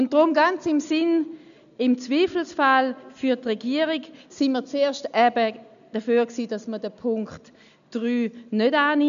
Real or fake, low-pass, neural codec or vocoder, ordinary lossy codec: real; 7.2 kHz; none; none